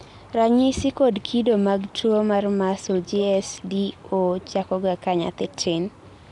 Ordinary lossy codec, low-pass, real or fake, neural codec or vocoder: none; 10.8 kHz; real; none